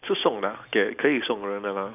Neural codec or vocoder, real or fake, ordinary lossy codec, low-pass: none; real; none; 3.6 kHz